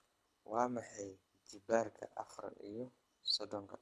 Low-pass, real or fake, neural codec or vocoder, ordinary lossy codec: none; fake; codec, 24 kHz, 6 kbps, HILCodec; none